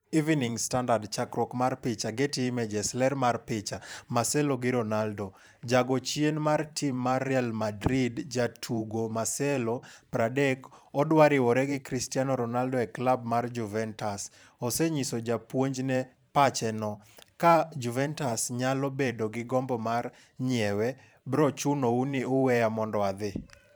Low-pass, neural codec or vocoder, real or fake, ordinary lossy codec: none; vocoder, 44.1 kHz, 128 mel bands every 512 samples, BigVGAN v2; fake; none